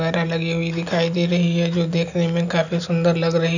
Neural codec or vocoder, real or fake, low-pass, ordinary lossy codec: none; real; 7.2 kHz; none